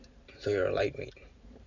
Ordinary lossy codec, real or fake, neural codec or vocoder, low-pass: none; fake; vocoder, 44.1 kHz, 128 mel bands every 512 samples, BigVGAN v2; 7.2 kHz